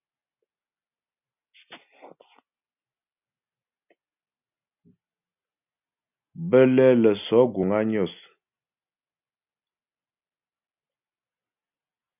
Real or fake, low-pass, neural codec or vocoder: real; 3.6 kHz; none